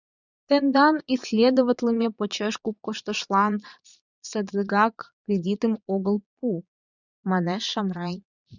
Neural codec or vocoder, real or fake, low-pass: vocoder, 22.05 kHz, 80 mel bands, Vocos; fake; 7.2 kHz